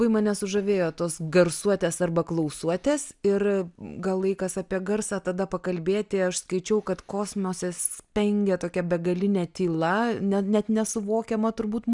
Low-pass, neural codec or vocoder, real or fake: 10.8 kHz; none; real